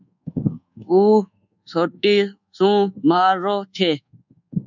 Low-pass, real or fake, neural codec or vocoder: 7.2 kHz; fake; codec, 24 kHz, 1.2 kbps, DualCodec